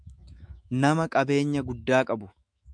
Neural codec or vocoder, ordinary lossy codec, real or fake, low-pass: autoencoder, 48 kHz, 128 numbers a frame, DAC-VAE, trained on Japanese speech; MP3, 96 kbps; fake; 9.9 kHz